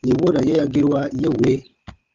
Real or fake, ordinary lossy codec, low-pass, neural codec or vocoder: fake; Opus, 32 kbps; 7.2 kHz; codec, 16 kHz, 16 kbps, FunCodec, trained on Chinese and English, 50 frames a second